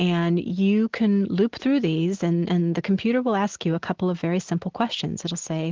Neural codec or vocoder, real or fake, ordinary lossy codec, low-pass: none; real; Opus, 16 kbps; 7.2 kHz